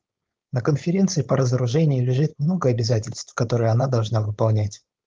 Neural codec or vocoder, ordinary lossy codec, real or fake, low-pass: codec, 16 kHz, 4.8 kbps, FACodec; Opus, 24 kbps; fake; 7.2 kHz